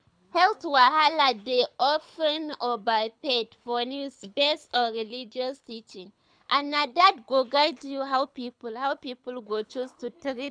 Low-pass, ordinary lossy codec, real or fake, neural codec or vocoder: 9.9 kHz; none; fake; codec, 24 kHz, 6 kbps, HILCodec